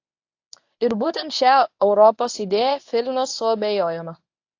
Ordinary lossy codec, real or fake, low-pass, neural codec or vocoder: AAC, 48 kbps; fake; 7.2 kHz; codec, 24 kHz, 0.9 kbps, WavTokenizer, medium speech release version 1